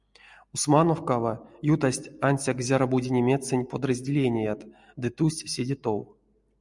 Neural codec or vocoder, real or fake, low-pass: none; real; 10.8 kHz